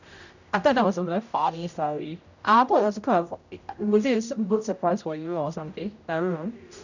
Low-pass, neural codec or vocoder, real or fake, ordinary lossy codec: 7.2 kHz; codec, 16 kHz, 0.5 kbps, X-Codec, HuBERT features, trained on general audio; fake; none